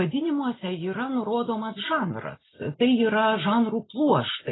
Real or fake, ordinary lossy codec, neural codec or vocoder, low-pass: real; AAC, 16 kbps; none; 7.2 kHz